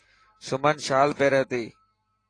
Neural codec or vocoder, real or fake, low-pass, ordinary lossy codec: vocoder, 44.1 kHz, 128 mel bands every 512 samples, BigVGAN v2; fake; 9.9 kHz; AAC, 32 kbps